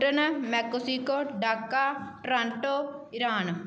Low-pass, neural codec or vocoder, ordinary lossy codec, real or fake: none; none; none; real